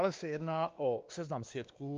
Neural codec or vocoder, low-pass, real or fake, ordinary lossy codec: codec, 16 kHz, 2 kbps, X-Codec, WavLM features, trained on Multilingual LibriSpeech; 7.2 kHz; fake; Opus, 24 kbps